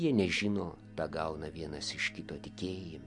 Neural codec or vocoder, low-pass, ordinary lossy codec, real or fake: none; 10.8 kHz; MP3, 64 kbps; real